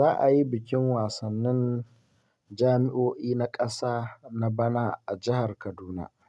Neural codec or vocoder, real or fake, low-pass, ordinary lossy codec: none; real; none; none